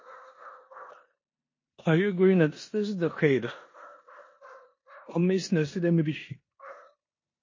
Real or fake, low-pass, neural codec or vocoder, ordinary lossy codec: fake; 7.2 kHz; codec, 16 kHz in and 24 kHz out, 0.9 kbps, LongCat-Audio-Codec, four codebook decoder; MP3, 32 kbps